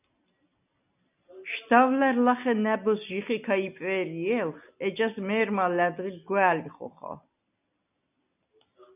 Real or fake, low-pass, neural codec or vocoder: real; 3.6 kHz; none